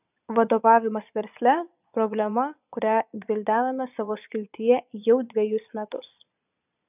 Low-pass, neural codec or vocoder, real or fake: 3.6 kHz; vocoder, 22.05 kHz, 80 mel bands, Vocos; fake